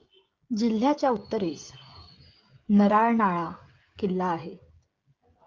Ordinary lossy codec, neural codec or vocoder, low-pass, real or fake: Opus, 24 kbps; codec, 16 kHz, 16 kbps, FreqCodec, smaller model; 7.2 kHz; fake